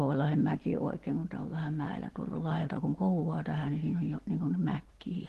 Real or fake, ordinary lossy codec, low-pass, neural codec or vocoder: real; Opus, 16 kbps; 14.4 kHz; none